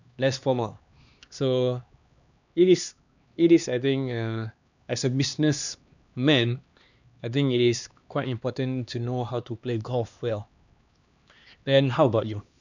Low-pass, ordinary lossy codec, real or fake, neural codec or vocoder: 7.2 kHz; none; fake; codec, 16 kHz, 2 kbps, X-Codec, HuBERT features, trained on LibriSpeech